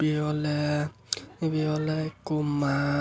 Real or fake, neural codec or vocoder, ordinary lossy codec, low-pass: real; none; none; none